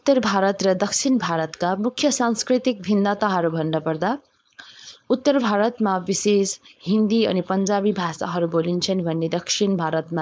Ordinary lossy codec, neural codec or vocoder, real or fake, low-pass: none; codec, 16 kHz, 4.8 kbps, FACodec; fake; none